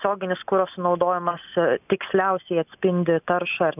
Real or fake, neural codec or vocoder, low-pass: real; none; 3.6 kHz